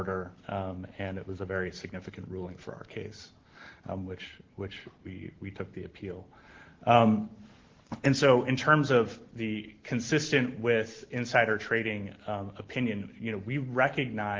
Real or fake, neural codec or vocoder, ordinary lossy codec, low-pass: real; none; Opus, 16 kbps; 7.2 kHz